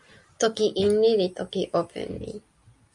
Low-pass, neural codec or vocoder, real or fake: 10.8 kHz; none; real